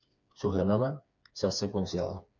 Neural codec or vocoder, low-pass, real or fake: codec, 16 kHz, 4 kbps, FreqCodec, smaller model; 7.2 kHz; fake